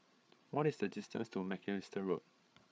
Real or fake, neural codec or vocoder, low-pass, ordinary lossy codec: fake; codec, 16 kHz, 8 kbps, FreqCodec, larger model; none; none